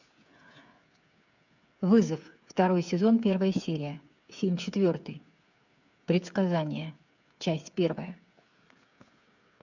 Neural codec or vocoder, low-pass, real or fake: codec, 16 kHz, 8 kbps, FreqCodec, smaller model; 7.2 kHz; fake